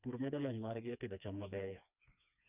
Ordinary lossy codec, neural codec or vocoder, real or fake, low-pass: none; codec, 16 kHz, 2 kbps, FreqCodec, smaller model; fake; 3.6 kHz